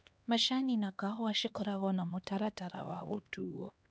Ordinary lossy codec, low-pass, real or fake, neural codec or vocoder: none; none; fake; codec, 16 kHz, 2 kbps, X-Codec, HuBERT features, trained on LibriSpeech